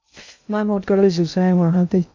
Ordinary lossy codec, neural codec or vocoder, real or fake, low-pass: MP3, 64 kbps; codec, 16 kHz in and 24 kHz out, 0.6 kbps, FocalCodec, streaming, 2048 codes; fake; 7.2 kHz